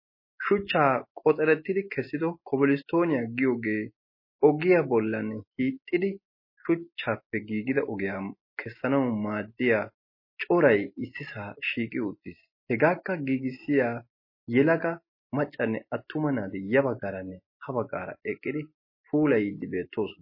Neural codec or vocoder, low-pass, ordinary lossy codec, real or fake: none; 5.4 kHz; MP3, 24 kbps; real